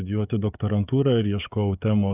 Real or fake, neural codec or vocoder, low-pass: fake; codec, 16 kHz, 16 kbps, FreqCodec, smaller model; 3.6 kHz